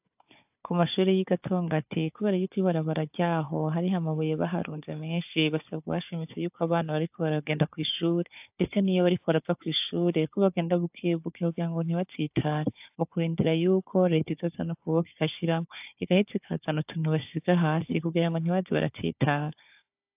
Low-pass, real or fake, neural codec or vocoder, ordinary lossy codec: 3.6 kHz; fake; codec, 16 kHz, 4 kbps, FunCodec, trained on Chinese and English, 50 frames a second; AAC, 32 kbps